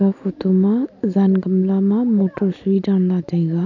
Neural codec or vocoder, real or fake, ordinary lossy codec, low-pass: none; real; none; 7.2 kHz